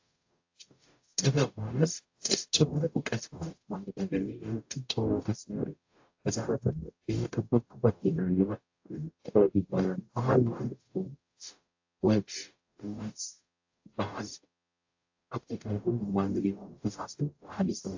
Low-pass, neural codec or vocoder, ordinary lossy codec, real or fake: 7.2 kHz; codec, 44.1 kHz, 0.9 kbps, DAC; AAC, 48 kbps; fake